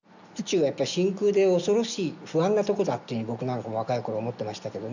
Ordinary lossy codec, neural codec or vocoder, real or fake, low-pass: none; none; real; 7.2 kHz